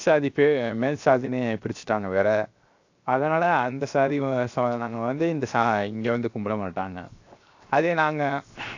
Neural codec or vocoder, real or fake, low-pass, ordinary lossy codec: codec, 16 kHz, 0.7 kbps, FocalCodec; fake; 7.2 kHz; AAC, 48 kbps